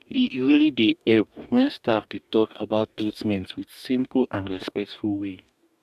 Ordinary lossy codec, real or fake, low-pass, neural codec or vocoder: AAC, 96 kbps; fake; 14.4 kHz; codec, 44.1 kHz, 2.6 kbps, DAC